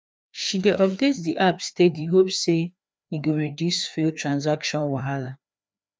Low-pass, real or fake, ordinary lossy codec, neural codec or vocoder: none; fake; none; codec, 16 kHz, 2 kbps, FreqCodec, larger model